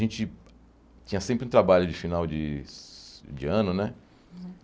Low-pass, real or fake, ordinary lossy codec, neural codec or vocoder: none; real; none; none